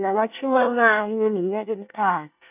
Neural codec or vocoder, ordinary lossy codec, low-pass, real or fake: codec, 24 kHz, 1 kbps, SNAC; none; 3.6 kHz; fake